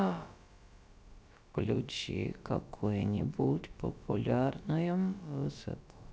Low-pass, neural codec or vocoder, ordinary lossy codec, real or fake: none; codec, 16 kHz, about 1 kbps, DyCAST, with the encoder's durations; none; fake